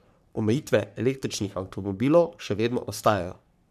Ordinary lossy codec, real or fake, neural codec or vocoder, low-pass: none; fake; codec, 44.1 kHz, 3.4 kbps, Pupu-Codec; 14.4 kHz